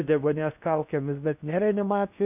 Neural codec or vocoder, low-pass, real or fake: codec, 16 kHz in and 24 kHz out, 0.8 kbps, FocalCodec, streaming, 65536 codes; 3.6 kHz; fake